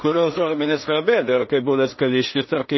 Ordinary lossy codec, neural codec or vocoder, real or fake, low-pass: MP3, 24 kbps; codec, 16 kHz in and 24 kHz out, 0.4 kbps, LongCat-Audio-Codec, two codebook decoder; fake; 7.2 kHz